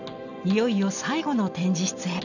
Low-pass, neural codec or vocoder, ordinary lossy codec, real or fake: 7.2 kHz; none; none; real